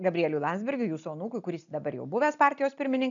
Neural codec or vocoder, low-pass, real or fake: none; 7.2 kHz; real